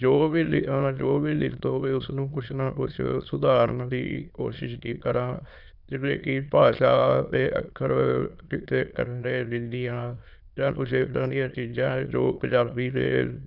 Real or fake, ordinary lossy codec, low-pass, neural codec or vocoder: fake; none; 5.4 kHz; autoencoder, 22.05 kHz, a latent of 192 numbers a frame, VITS, trained on many speakers